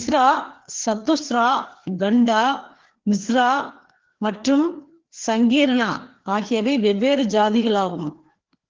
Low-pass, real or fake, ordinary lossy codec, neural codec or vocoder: 7.2 kHz; fake; Opus, 16 kbps; codec, 16 kHz, 2 kbps, FreqCodec, larger model